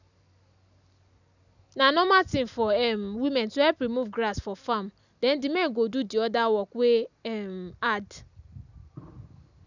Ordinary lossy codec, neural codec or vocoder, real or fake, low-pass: none; none; real; 7.2 kHz